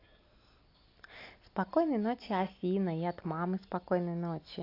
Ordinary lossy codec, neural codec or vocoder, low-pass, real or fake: AAC, 32 kbps; none; 5.4 kHz; real